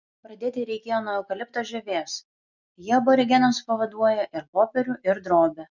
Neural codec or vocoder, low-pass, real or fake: none; 7.2 kHz; real